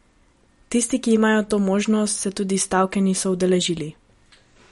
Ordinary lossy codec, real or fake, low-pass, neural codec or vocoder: MP3, 48 kbps; real; 19.8 kHz; none